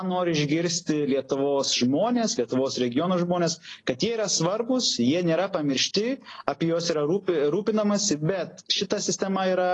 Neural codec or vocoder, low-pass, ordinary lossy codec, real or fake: none; 10.8 kHz; AAC, 32 kbps; real